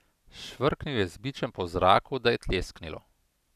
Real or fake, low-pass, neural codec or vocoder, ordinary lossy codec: real; 14.4 kHz; none; none